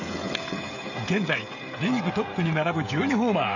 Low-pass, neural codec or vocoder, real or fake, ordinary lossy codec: 7.2 kHz; codec, 16 kHz, 16 kbps, FreqCodec, smaller model; fake; none